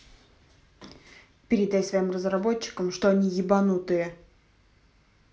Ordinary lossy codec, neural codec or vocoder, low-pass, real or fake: none; none; none; real